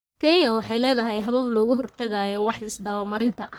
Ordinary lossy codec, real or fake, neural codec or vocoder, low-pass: none; fake; codec, 44.1 kHz, 1.7 kbps, Pupu-Codec; none